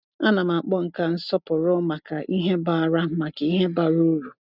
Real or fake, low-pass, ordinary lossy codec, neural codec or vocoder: real; 5.4 kHz; none; none